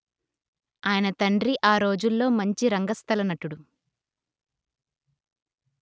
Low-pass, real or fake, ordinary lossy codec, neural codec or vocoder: none; real; none; none